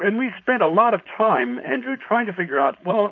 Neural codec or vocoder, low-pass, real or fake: codec, 16 kHz, 4.8 kbps, FACodec; 7.2 kHz; fake